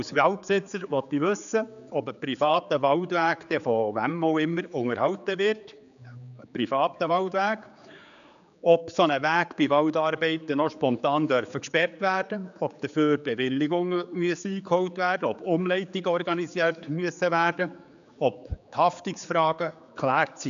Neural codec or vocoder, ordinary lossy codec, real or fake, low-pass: codec, 16 kHz, 4 kbps, X-Codec, HuBERT features, trained on general audio; none; fake; 7.2 kHz